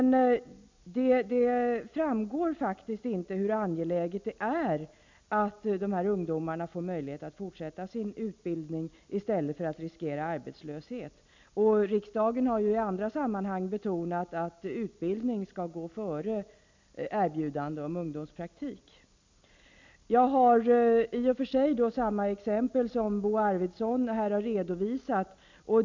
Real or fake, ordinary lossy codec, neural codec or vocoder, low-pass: real; MP3, 64 kbps; none; 7.2 kHz